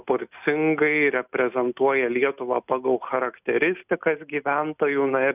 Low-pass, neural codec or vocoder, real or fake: 3.6 kHz; none; real